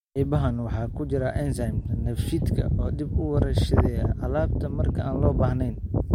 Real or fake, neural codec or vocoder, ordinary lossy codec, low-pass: real; none; MP3, 64 kbps; 19.8 kHz